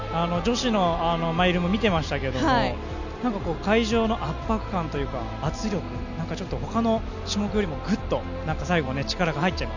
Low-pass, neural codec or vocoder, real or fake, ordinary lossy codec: 7.2 kHz; none; real; none